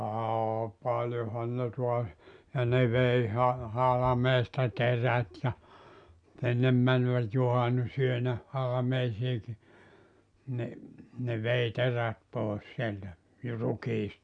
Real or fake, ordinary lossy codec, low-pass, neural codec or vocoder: real; none; 9.9 kHz; none